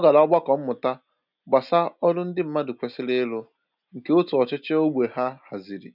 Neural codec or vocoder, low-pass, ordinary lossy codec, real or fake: none; 5.4 kHz; none; real